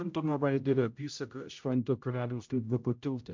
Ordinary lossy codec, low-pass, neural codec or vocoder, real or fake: MP3, 64 kbps; 7.2 kHz; codec, 16 kHz, 0.5 kbps, X-Codec, HuBERT features, trained on general audio; fake